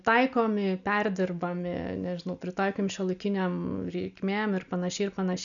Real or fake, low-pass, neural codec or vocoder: real; 7.2 kHz; none